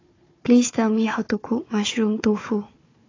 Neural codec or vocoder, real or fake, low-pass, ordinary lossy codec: codec, 16 kHz, 4 kbps, FunCodec, trained on Chinese and English, 50 frames a second; fake; 7.2 kHz; AAC, 32 kbps